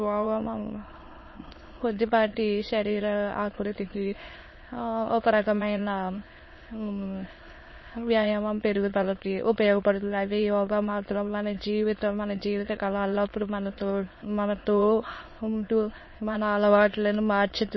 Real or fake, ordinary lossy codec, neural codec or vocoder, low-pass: fake; MP3, 24 kbps; autoencoder, 22.05 kHz, a latent of 192 numbers a frame, VITS, trained on many speakers; 7.2 kHz